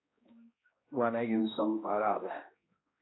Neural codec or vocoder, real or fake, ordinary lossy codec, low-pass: codec, 16 kHz, 1 kbps, X-Codec, HuBERT features, trained on balanced general audio; fake; AAC, 16 kbps; 7.2 kHz